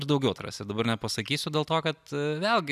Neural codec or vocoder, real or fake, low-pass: none; real; 14.4 kHz